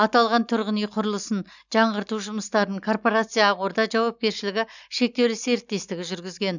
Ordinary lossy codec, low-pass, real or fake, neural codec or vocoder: none; 7.2 kHz; real; none